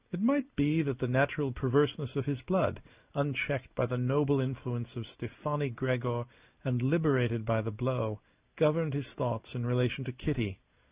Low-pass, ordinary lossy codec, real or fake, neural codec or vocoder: 3.6 kHz; Opus, 64 kbps; real; none